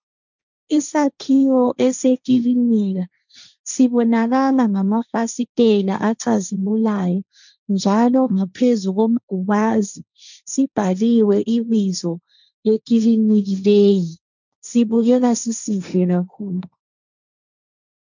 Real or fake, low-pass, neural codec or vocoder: fake; 7.2 kHz; codec, 16 kHz, 1.1 kbps, Voila-Tokenizer